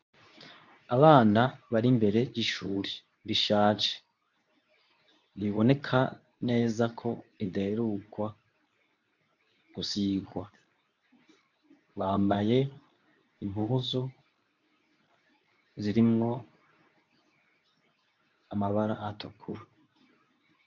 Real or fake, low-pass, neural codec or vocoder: fake; 7.2 kHz; codec, 24 kHz, 0.9 kbps, WavTokenizer, medium speech release version 2